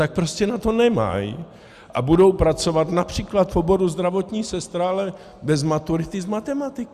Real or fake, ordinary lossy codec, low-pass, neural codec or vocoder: real; Opus, 64 kbps; 14.4 kHz; none